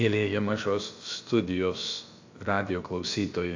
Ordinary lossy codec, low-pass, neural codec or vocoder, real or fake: AAC, 48 kbps; 7.2 kHz; codec, 16 kHz, about 1 kbps, DyCAST, with the encoder's durations; fake